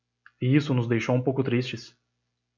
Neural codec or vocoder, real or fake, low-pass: none; real; 7.2 kHz